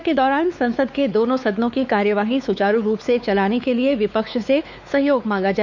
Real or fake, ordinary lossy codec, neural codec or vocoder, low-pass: fake; none; codec, 16 kHz, 4 kbps, X-Codec, WavLM features, trained on Multilingual LibriSpeech; 7.2 kHz